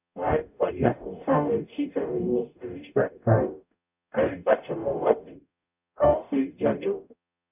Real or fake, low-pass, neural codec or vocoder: fake; 3.6 kHz; codec, 44.1 kHz, 0.9 kbps, DAC